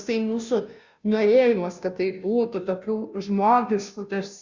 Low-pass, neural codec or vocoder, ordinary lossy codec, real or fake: 7.2 kHz; codec, 16 kHz, 0.5 kbps, FunCodec, trained on Chinese and English, 25 frames a second; Opus, 64 kbps; fake